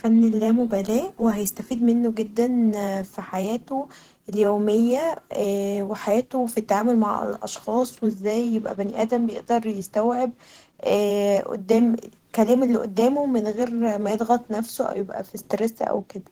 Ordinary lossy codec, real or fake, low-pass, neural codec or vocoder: Opus, 16 kbps; fake; 19.8 kHz; vocoder, 44.1 kHz, 128 mel bands every 512 samples, BigVGAN v2